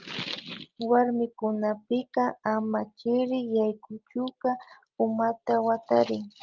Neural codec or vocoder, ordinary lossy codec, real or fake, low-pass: none; Opus, 32 kbps; real; 7.2 kHz